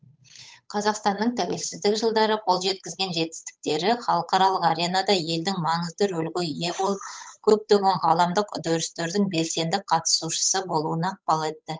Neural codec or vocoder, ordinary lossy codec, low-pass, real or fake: codec, 16 kHz, 8 kbps, FunCodec, trained on Chinese and English, 25 frames a second; none; none; fake